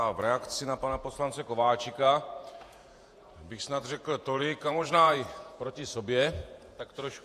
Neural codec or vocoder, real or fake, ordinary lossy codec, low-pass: vocoder, 48 kHz, 128 mel bands, Vocos; fake; AAC, 64 kbps; 14.4 kHz